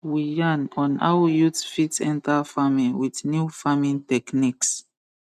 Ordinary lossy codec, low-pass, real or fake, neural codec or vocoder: none; 14.4 kHz; real; none